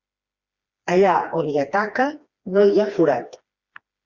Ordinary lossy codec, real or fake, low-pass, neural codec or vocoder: Opus, 64 kbps; fake; 7.2 kHz; codec, 16 kHz, 2 kbps, FreqCodec, smaller model